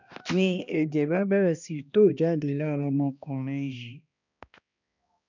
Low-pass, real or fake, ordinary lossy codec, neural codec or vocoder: 7.2 kHz; fake; none; codec, 16 kHz, 1 kbps, X-Codec, HuBERT features, trained on balanced general audio